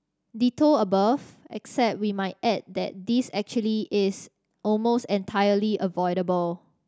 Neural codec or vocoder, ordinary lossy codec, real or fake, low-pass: none; none; real; none